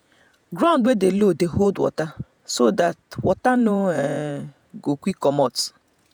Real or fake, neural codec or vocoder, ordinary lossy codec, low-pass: fake; vocoder, 48 kHz, 128 mel bands, Vocos; none; none